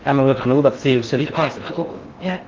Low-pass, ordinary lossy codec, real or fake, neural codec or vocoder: 7.2 kHz; Opus, 32 kbps; fake; codec, 16 kHz in and 24 kHz out, 0.6 kbps, FocalCodec, streaming, 4096 codes